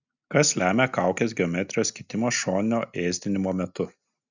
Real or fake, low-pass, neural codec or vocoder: real; 7.2 kHz; none